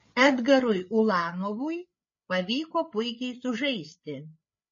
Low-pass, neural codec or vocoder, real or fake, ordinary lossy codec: 7.2 kHz; codec, 16 kHz, 8 kbps, FreqCodec, larger model; fake; MP3, 32 kbps